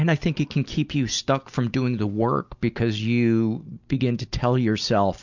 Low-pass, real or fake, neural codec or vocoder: 7.2 kHz; real; none